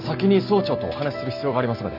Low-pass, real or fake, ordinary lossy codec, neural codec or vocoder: 5.4 kHz; real; none; none